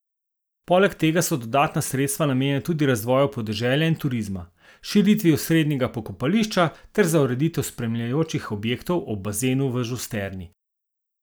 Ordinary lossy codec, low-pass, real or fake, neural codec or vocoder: none; none; real; none